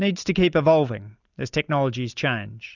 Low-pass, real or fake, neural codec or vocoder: 7.2 kHz; real; none